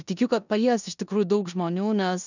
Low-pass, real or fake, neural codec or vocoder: 7.2 kHz; fake; codec, 16 kHz in and 24 kHz out, 0.9 kbps, LongCat-Audio-Codec, four codebook decoder